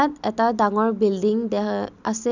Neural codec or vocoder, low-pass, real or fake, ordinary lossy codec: none; 7.2 kHz; real; none